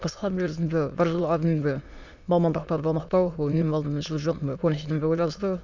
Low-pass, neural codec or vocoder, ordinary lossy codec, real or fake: 7.2 kHz; autoencoder, 22.05 kHz, a latent of 192 numbers a frame, VITS, trained on many speakers; Opus, 64 kbps; fake